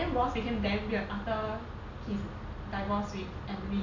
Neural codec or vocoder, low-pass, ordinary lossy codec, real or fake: none; 7.2 kHz; none; real